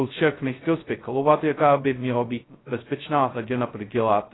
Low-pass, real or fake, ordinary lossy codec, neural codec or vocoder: 7.2 kHz; fake; AAC, 16 kbps; codec, 16 kHz, 0.2 kbps, FocalCodec